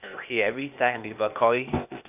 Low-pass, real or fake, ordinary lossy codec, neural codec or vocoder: 3.6 kHz; fake; none; codec, 16 kHz, 0.8 kbps, ZipCodec